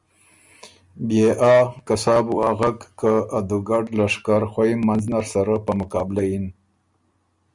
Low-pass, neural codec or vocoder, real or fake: 10.8 kHz; none; real